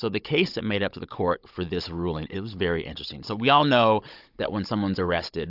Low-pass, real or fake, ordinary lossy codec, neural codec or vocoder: 5.4 kHz; fake; AAC, 48 kbps; codec, 16 kHz, 16 kbps, FunCodec, trained on Chinese and English, 50 frames a second